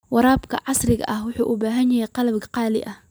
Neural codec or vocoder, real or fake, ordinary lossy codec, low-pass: none; real; none; none